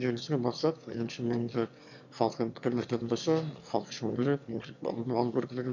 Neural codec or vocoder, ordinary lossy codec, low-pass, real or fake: autoencoder, 22.05 kHz, a latent of 192 numbers a frame, VITS, trained on one speaker; none; 7.2 kHz; fake